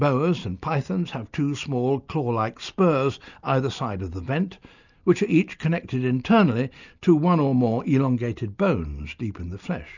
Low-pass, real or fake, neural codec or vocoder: 7.2 kHz; real; none